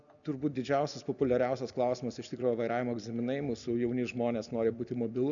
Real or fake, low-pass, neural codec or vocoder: real; 7.2 kHz; none